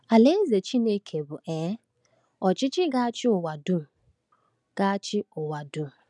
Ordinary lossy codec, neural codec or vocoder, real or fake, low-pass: none; none; real; 10.8 kHz